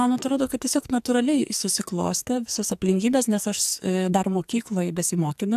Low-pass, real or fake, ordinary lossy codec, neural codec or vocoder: 14.4 kHz; fake; AAC, 96 kbps; codec, 44.1 kHz, 2.6 kbps, SNAC